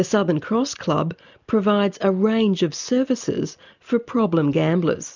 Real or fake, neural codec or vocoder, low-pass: real; none; 7.2 kHz